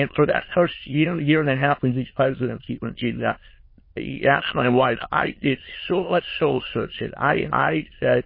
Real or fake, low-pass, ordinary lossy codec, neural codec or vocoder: fake; 5.4 kHz; MP3, 24 kbps; autoencoder, 22.05 kHz, a latent of 192 numbers a frame, VITS, trained on many speakers